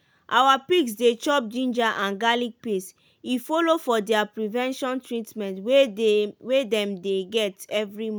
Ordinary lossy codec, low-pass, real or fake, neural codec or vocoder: none; none; real; none